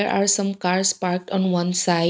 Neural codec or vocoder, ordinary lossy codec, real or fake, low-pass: none; none; real; none